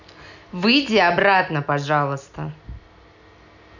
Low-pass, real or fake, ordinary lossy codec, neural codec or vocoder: 7.2 kHz; real; none; none